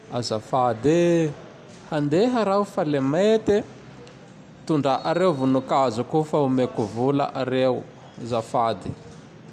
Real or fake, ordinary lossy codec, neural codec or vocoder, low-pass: real; none; none; 14.4 kHz